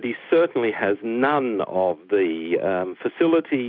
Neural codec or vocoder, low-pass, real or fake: none; 5.4 kHz; real